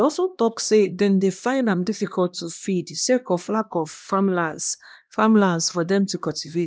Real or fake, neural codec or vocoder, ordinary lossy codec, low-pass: fake; codec, 16 kHz, 1 kbps, X-Codec, HuBERT features, trained on LibriSpeech; none; none